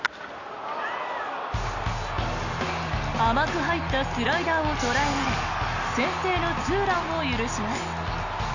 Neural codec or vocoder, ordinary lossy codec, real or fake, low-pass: none; none; real; 7.2 kHz